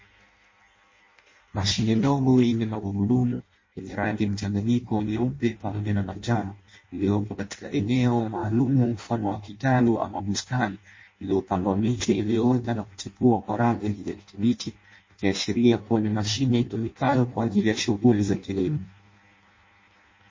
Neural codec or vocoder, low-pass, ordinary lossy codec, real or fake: codec, 16 kHz in and 24 kHz out, 0.6 kbps, FireRedTTS-2 codec; 7.2 kHz; MP3, 32 kbps; fake